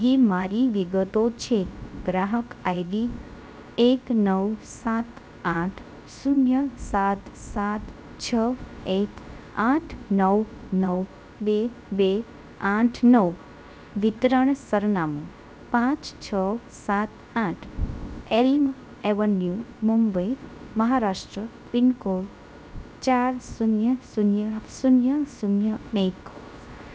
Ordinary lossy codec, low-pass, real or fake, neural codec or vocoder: none; none; fake; codec, 16 kHz, 0.3 kbps, FocalCodec